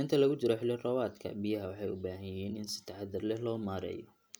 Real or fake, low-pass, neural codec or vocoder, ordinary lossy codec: real; none; none; none